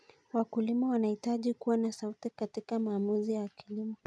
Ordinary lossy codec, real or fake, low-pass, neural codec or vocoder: none; real; none; none